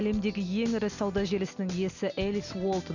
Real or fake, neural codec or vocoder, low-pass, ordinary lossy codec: real; none; 7.2 kHz; none